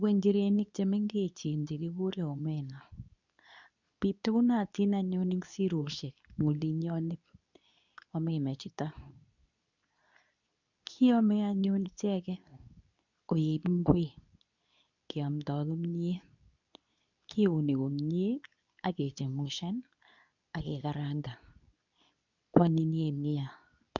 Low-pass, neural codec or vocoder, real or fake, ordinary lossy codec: 7.2 kHz; codec, 24 kHz, 0.9 kbps, WavTokenizer, medium speech release version 2; fake; none